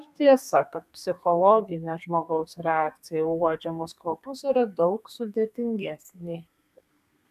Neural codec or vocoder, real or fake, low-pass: codec, 32 kHz, 1.9 kbps, SNAC; fake; 14.4 kHz